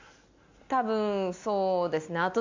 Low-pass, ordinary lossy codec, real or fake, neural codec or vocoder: 7.2 kHz; MP3, 64 kbps; real; none